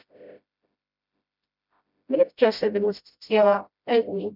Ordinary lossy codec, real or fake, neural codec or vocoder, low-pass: none; fake; codec, 16 kHz, 0.5 kbps, FreqCodec, smaller model; 5.4 kHz